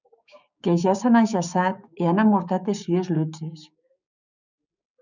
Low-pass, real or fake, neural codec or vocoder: 7.2 kHz; fake; codec, 16 kHz, 6 kbps, DAC